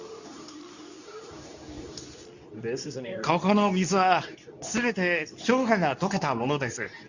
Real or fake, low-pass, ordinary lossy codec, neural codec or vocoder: fake; 7.2 kHz; none; codec, 24 kHz, 0.9 kbps, WavTokenizer, medium speech release version 2